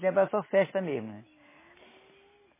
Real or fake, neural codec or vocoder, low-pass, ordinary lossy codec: real; none; 3.6 kHz; MP3, 16 kbps